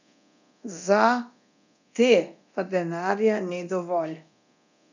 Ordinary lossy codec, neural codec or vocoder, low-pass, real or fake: none; codec, 24 kHz, 0.9 kbps, DualCodec; 7.2 kHz; fake